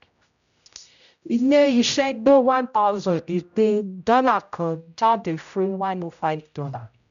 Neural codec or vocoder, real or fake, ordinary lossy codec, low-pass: codec, 16 kHz, 0.5 kbps, X-Codec, HuBERT features, trained on general audio; fake; MP3, 96 kbps; 7.2 kHz